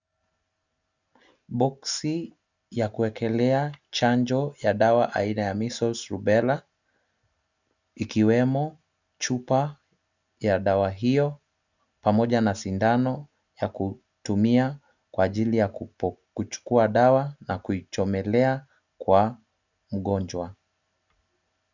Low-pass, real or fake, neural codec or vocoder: 7.2 kHz; real; none